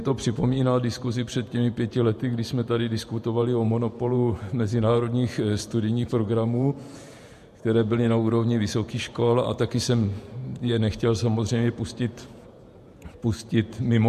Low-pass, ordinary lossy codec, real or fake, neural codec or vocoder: 14.4 kHz; MP3, 64 kbps; fake; vocoder, 48 kHz, 128 mel bands, Vocos